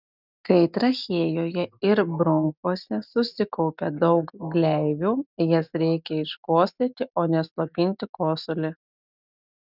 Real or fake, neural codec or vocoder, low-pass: real; none; 5.4 kHz